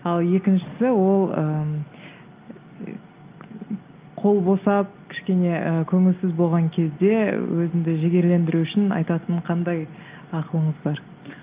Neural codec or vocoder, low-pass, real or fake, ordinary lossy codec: none; 3.6 kHz; real; Opus, 24 kbps